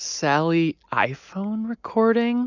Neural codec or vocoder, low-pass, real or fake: none; 7.2 kHz; real